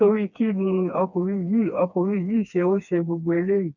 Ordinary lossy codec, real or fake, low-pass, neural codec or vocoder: none; fake; 7.2 kHz; codec, 16 kHz, 2 kbps, FreqCodec, smaller model